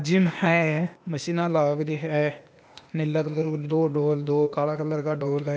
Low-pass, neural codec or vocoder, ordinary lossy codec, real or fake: none; codec, 16 kHz, 0.8 kbps, ZipCodec; none; fake